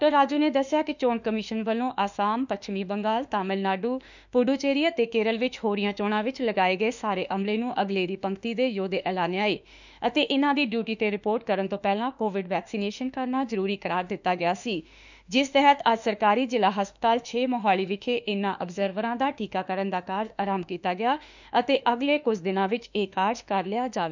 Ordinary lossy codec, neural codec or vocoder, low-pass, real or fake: none; autoencoder, 48 kHz, 32 numbers a frame, DAC-VAE, trained on Japanese speech; 7.2 kHz; fake